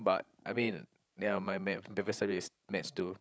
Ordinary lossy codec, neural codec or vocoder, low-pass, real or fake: none; codec, 16 kHz, 16 kbps, FreqCodec, larger model; none; fake